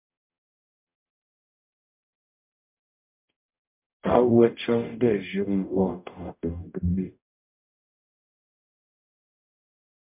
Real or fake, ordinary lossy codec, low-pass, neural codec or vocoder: fake; MP3, 32 kbps; 3.6 kHz; codec, 44.1 kHz, 0.9 kbps, DAC